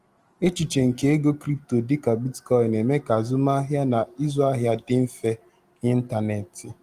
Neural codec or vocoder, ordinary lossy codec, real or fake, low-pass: none; Opus, 24 kbps; real; 14.4 kHz